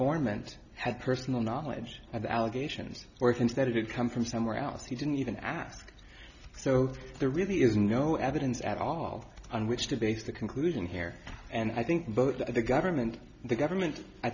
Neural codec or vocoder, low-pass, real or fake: none; 7.2 kHz; real